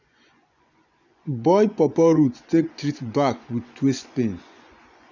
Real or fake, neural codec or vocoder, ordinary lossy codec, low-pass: fake; vocoder, 24 kHz, 100 mel bands, Vocos; none; 7.2 kHz